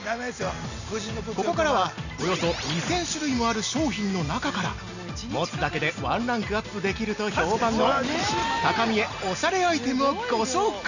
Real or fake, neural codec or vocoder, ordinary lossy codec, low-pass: real; none; none; 7.2 kHz